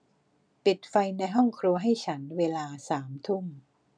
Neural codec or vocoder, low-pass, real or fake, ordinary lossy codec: none; 9.9 kHz; real; none